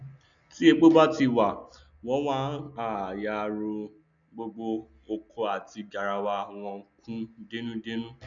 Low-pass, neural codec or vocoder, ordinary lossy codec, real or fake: 7.2 kHz; none; none; real